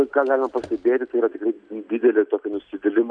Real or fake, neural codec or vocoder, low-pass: real; none; 9.9 kHz